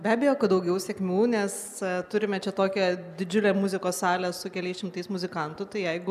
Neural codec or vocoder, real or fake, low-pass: none; real; 14.4 kHz